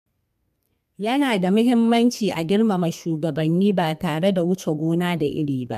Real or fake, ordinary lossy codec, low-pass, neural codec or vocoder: fake; AAC, 96 kbps; 14.4 kHz; codec, 32 kHz, 1.9 kbps, SNAC